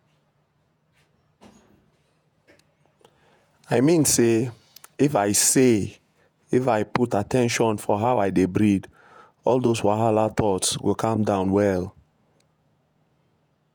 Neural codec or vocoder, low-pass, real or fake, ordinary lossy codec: vocoder, 48 kHz, 128 mel bands, Vocos; none; fake; none